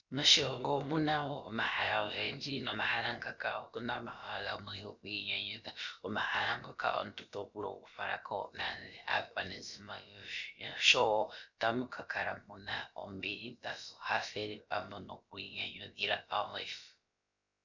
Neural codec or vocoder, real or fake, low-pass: codec, 16 kHz, about 1 kbps, DyCAST, with the encoder's durations; fake; 7.2 kHz